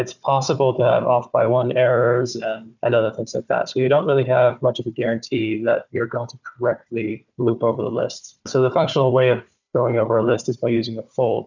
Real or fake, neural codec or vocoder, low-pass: fake; codec, 16 kHz, 4 kbps, FunCodec, trained on Chinese and English, 50 frames a second; 7.2 kHz